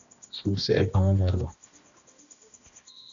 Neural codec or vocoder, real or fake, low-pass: codec, 16 kHz, 1 kbps, X-Codec, HuBERT features, trained on balanced general audio; fake; 7.2 kHz